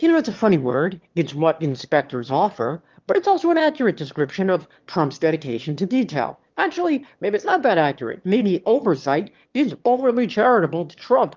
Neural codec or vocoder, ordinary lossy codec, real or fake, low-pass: autoencoder, 22.05 kHz, a latent of 192 numbers a frame, VITS, trained on one speaker; Opus, 32 kbps; fake; 7.2 kHz